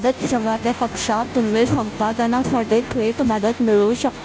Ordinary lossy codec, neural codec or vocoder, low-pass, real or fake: none; codec, 16 kHz, 0.5 kbps, FunCodec, trained on Chinese and English, 25 frames a second; none; fake